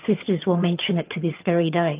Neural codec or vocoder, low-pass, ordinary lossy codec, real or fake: vocoder, 22.05 kHz, 80 mel bands, HiFi-GAN; 3.6 kHz; Opus, 32 kbps; fake